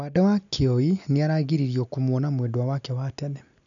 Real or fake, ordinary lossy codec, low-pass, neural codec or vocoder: real; none; 7.2 kHz; none